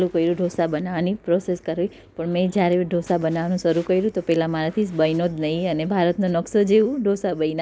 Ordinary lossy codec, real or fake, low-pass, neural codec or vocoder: none; real; none; none